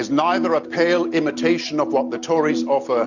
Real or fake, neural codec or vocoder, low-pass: real; none; 7.2 kHz